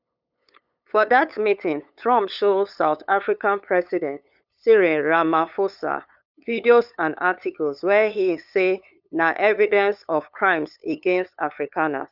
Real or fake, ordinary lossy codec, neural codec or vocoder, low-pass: fake; none; codec, 16 kHz, 8 kbps, FunCodec, trained on LibriTTS, 25 frames a second; 5.4 kHz